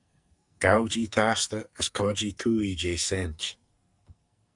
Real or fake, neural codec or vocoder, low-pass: fake; codec, 44.1 kHz, 2.6 kbps, SNAC; 10.8 kHz